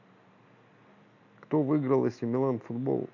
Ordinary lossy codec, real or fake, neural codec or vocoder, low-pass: none; real; none; 7.2 kHz